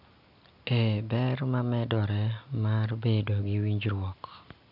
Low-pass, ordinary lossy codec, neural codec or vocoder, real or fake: 5.4 kHz; none; none; real